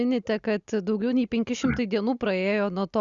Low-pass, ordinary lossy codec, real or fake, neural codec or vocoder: 7.2 kHz; Opus, 64 kbps; real; none